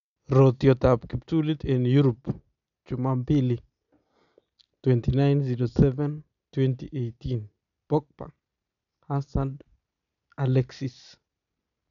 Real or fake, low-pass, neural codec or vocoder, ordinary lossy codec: real; 7.2 kHz; none; none